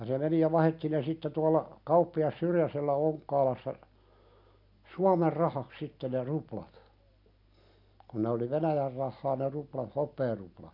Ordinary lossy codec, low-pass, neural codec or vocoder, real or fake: none; 5.4 kHz; none; real